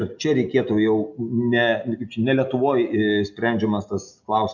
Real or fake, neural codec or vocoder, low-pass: real; none; 7.2 kHz